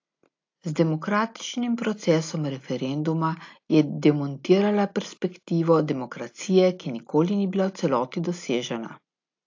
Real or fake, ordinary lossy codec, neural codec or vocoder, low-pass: real; AAC, 48 kbps; none; 7.2 kHz